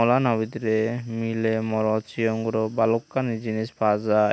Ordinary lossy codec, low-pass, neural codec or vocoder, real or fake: none; none; none; real